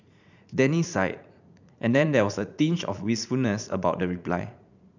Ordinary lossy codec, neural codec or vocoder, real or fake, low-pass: none; vocoder, 44.1 kHz, 80 mel bands, Vocos; fake; 7.2 kHz